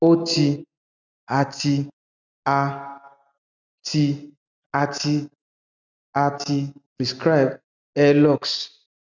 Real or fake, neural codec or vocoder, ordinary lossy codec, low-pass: real; none; none; 7.2 kHz